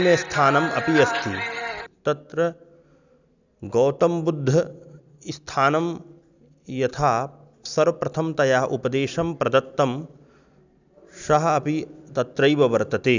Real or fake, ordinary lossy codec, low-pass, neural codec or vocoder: real; none; 7.2 kHz; none